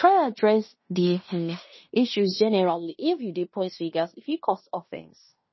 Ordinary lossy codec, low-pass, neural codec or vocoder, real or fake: MP3, 24 kbps; 7.2 kHz; codec, 16 kHz in and 24 kHz out, 0.9 kbps, LongCat-Audio-Codec, fine tuned four codebook decoder; fake